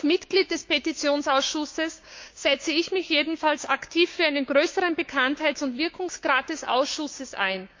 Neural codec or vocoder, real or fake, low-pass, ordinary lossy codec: codec, 16 kHz, 6 kbps, DAC; fake; 7.2 kHz; MP3, 48 kbps